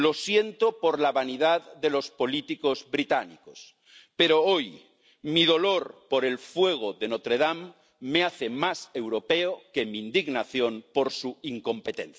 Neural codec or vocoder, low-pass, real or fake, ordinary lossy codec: none; none; real; none